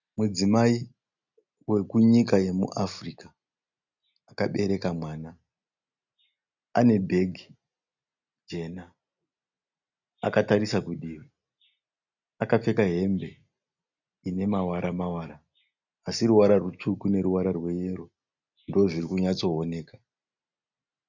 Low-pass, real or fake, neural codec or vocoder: 7.2 kHz; real; none